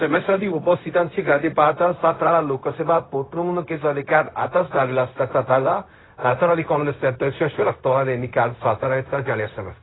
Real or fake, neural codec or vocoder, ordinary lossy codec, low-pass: fake; codec, 16 kHz, 0.4 kbps, LongCat-Audio-Codec; AAC, 16 kbps; 7.2 kHz